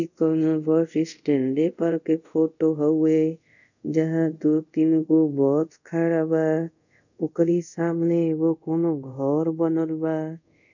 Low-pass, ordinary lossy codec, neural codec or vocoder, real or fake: 7.2 kHz; none; codec, 24 kHz, 0.5 kbps, DualCodec; fake